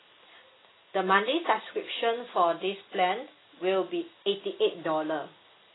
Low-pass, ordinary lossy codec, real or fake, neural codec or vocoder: 7.2 kHz; AAC, 16 kbps; real; none